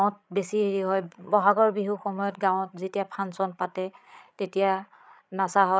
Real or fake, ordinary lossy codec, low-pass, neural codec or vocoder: fake; none; none; codec, 16 kHz, 16 kbps, FreqCodec, larger model